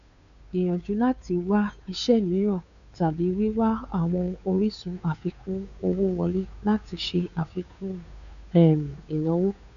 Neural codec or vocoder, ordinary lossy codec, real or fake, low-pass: codec, 16 kHz, 2 kbps, FunCodec, trained on Chinese and English, 25 frames a second; none; fake; 7.2 kHz